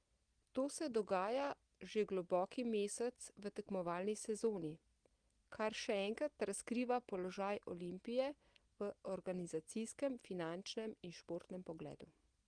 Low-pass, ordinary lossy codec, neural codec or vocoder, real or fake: 9.9 kHz; Opus, 24 kbps; none; real